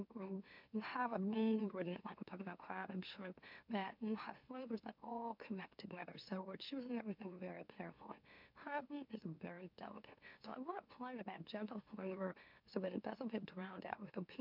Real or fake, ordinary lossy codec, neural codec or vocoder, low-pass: fake; MP3, 48 kbps; autoencoder, 44.1 kHz, a latent of 192 numbers a frame, MeloTTS; 5.4 kHz